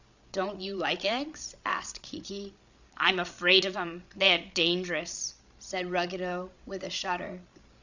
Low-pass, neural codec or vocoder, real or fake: 7.2 kHz; codec, 16 kHz, 16 kbps, FreqCodec, larger model; fake